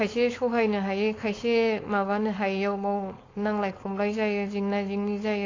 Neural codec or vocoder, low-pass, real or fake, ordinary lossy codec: codec, 16 kHz, 4.8 kbps, FACodec; 7.2 kHz; fake; AAC, 32 kbps